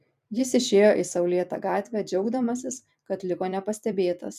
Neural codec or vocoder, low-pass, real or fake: vocoder, 44.1 kHz, 128 mel bands every 256 samples, BigVGAN v2; 14.4 kHz; fake